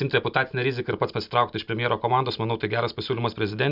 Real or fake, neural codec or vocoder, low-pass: real; none; 5.4 kHz